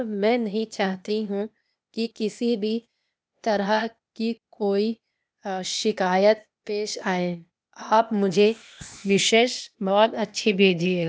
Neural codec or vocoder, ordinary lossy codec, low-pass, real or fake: codec, 16 kHz, 0.8 kbps, ZipCodec; none; none; fake